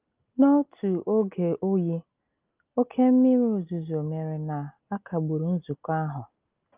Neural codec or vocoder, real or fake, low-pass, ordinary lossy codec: none; real; 3.6 kHz; Opus, 24 kbps